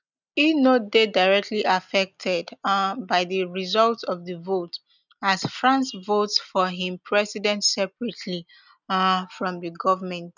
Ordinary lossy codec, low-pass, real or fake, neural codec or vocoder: none; 7.2 kHz; real; none